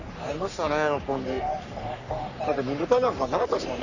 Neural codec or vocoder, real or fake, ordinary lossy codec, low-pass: codec, 44.1 kHz, 3.4 kbps, Pupu-Codec; fake; none; 7.2 kHz